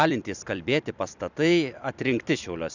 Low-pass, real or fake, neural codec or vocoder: 7.2 kHz; fake; vocoder, 44.1 kHz, 128 mel bands every 256 samples, BigVGAN v2